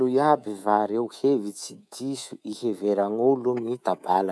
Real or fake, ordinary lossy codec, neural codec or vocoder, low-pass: fake; none; codec, 24 kHz, 3.1 kbps, DualCodec; none